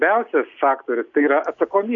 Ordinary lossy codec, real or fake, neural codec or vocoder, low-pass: MP3, 64 kbps; real; none; 7.2 kHz